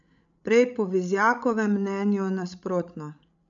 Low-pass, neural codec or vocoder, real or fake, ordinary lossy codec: 7.2 kHz; codec, 16 kHz, 16 kbps, FreqCodec, larger model; fake; none